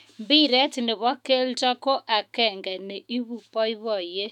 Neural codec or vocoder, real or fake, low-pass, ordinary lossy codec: autoencoder, 48 kHz, 128 numbers a frame, DAC-VAE, trained on Japanese speech; fake; 19.8 kHz; none